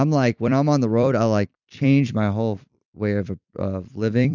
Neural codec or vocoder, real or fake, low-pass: vocoder, 44.1 kHz, 128 mel bands every 256 samples, BigVGAN v2; fake; 7.2 kHz